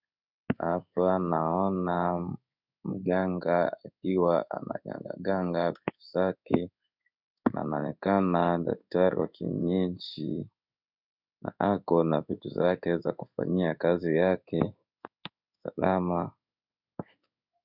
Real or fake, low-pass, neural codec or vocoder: fake; 5.4 kHz; codec, 16 kHz in and 24 kHz out, 1 kbps, XY-Tokenizer